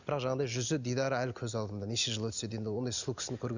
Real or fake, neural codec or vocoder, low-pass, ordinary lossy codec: real; none; 7.2 kHz; none